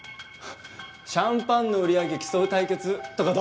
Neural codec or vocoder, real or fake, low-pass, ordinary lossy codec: none; real; none; none